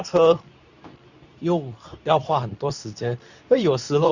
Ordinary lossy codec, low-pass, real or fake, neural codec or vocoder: none; 7.2 kHz; fake; codec, 24 kHz, 0.9 kbps, WavTokenizer, medium speech release version 2